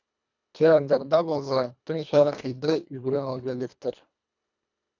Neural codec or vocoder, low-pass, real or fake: codec, 24 kHz, 1.5 kbps, HILCodec; 7.2 kHz; fake